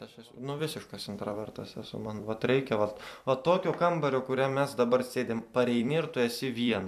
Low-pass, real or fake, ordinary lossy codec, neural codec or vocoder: 14.4 kHz; fake; MP3, 96 kbps; vocoder, 44.1 kHz, 128 mel bands every 256 samples, BigVGAN v2